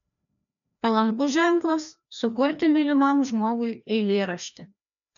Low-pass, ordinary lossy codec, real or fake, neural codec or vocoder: 7.2 kHz; MP3, 96 kbps; fake; codec, 16 kHz, 1 kbps, FreqCodec, larger model